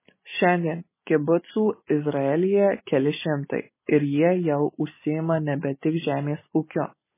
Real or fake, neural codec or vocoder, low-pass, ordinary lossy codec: real; none; 3.6 kHz; MP3, 16 kbps